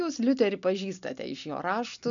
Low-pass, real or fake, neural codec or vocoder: 7.2 kHz; real; none